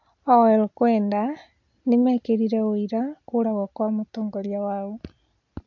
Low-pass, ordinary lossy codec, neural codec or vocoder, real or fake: 7.2 kHz; AAC, 48 kbps; none; real